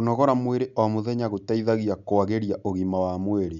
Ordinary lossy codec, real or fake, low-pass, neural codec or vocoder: none; real; 7.2 kHz; none